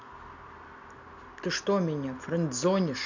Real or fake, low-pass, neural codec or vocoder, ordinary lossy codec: real; 7.2 kHz; none; none